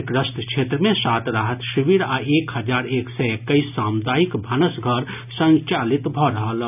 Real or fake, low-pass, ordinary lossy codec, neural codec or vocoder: real; 3.6 kHz; none; none